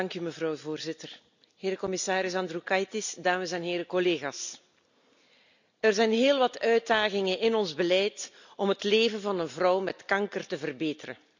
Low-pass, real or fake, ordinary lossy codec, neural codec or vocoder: 7.2 kHz; real; none; none